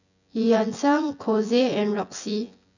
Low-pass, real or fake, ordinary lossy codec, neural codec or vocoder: 7.2 kHz; fake; none; vocoder, 24 kHz, 100 mel bands, Vocos